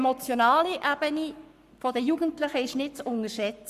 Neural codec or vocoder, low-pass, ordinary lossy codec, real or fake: codec, 44.1 kHz, 7.8 kbps, Pupu-Codec; 14.4 kHz; Opus, 64 kbps; fake